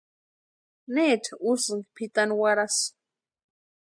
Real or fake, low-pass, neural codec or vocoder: real; 9.9 kHz; none